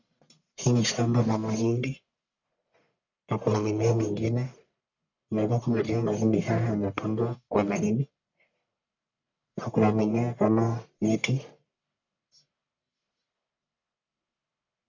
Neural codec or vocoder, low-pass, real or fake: codec, 44.1 kHz, 1.7 kbps, Pupu-Codec; 7.2 kHz; fake